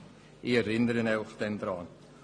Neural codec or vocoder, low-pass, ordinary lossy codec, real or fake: none; 9.9 kHz; AAC, 48 kbps; real